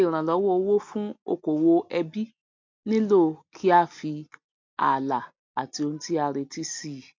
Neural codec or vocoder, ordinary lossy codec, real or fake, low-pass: none; MP3, 48 kbps; real; 7.2 kHz